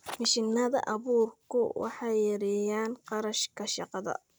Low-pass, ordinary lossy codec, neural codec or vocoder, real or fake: none; none; none; real